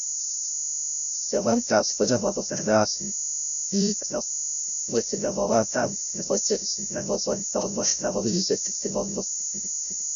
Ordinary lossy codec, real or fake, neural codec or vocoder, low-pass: none; fake; codec, 16 kHz, 0.5 kbps, FreqCodec, larger model; 7.2 kHz